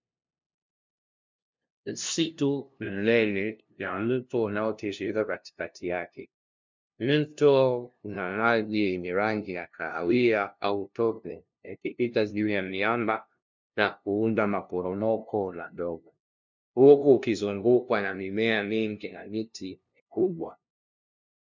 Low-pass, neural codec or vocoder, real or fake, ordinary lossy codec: 7.2 kHz; codec, 16 kHz, 0.5 kbps, FunCodec, trained on LibriTTS, 25 frames a second; fake; MP3, 64 kbps